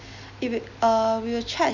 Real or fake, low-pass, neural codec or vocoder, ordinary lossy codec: real; 7.2 kHz; none; none